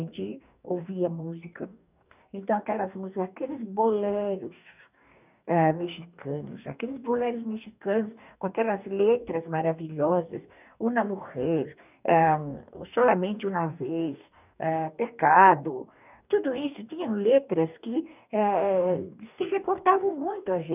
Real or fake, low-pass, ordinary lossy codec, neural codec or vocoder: fake; 3.6 kHz; none; codec, 44.1 kHz, 2.6 kbps, DAC